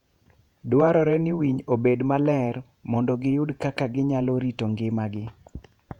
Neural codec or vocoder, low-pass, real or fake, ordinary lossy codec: vocoder, 48 kHz, 128 mel bands, Vocos; 19.8 kHz; fake; none